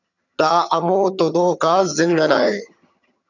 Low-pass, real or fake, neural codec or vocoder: 7.2 kHz; fake; vocoder, 22.05 kHz, 80 mel bands, HiFi-GAN